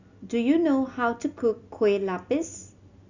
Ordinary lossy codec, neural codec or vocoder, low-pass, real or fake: Opus, 64 kbps; none; 7.2 kHz; real